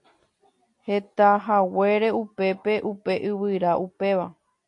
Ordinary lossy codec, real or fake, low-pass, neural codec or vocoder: AAC, 48 kbps; real; 10.8 kHz; none